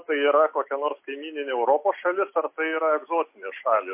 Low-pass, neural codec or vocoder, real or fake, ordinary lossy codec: 3.6 kHz; none; real; MP3, 24 kbps